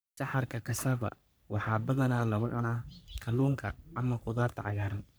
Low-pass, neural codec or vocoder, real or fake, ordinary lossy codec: none; codec, 44.1 kHz, 3.4 kbps, Pupu-Codec; fake; none